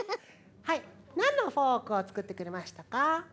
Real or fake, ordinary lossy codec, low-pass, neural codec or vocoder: real; none; none; none